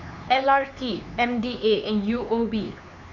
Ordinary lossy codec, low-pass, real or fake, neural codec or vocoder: none; 7.2 kHz; fake; codec, 16 kHz, 4 kbps, X-Codec, HuBERT features, trained on LibriSpeech